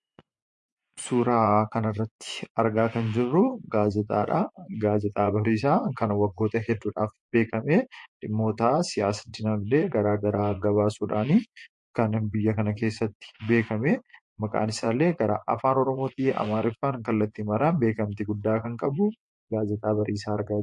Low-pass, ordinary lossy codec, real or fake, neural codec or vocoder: 10.8 kHz; MP3, 48 kbps; fake; vocoder, 24 kHz, 100 mel bands, Vocos